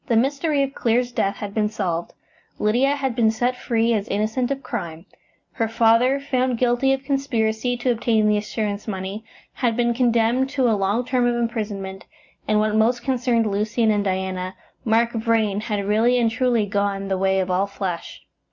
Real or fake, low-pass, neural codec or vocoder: real; 7.2 kHz; none